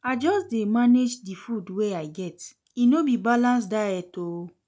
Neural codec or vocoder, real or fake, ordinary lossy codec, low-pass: none; real; none; none